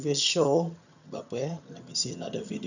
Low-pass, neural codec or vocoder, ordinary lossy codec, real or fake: 7.2 kHz; vocoder, 22.05 kHz, 80 mel bands, HiFi-GAN; none; fake